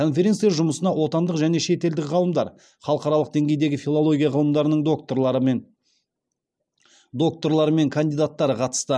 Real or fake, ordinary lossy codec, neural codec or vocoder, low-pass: real; none; none; none